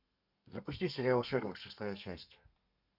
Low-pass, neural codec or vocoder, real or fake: 5.4 kHz; codec, 32 kHz, 1.9 kbps, SNAC; fake